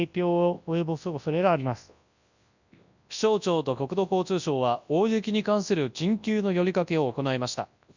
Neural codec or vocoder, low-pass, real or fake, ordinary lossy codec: codec, 24 kHz, 0.9 kbps, WavTokenizer, large speech release; 7.2 kHz; fake; none